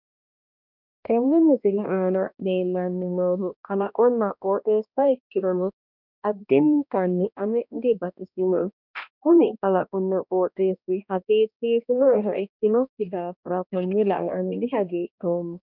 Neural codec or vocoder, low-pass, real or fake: codec, 16 kHz, 1 kbps, X-Codec, HuBERT features, trained on balanced general audio; 5.4 kHz; fake